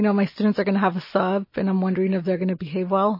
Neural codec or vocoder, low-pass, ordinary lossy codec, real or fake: none; 5.4 kHz; MP3, 24 kbps; real